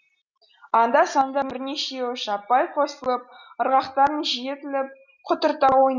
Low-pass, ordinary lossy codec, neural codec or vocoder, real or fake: 7.2 kHz; none; none; real